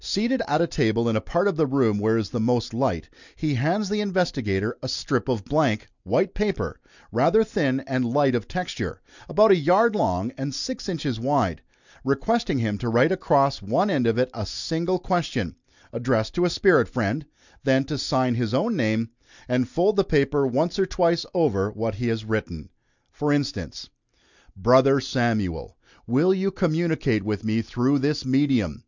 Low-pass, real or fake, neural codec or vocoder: 7.2 kHz; real; none